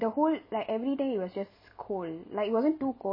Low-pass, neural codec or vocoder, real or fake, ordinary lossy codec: 5.4 kHz; none; real; MP3, 24 kbps